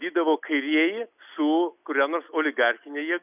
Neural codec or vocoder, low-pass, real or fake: none; 3.6 kHz; real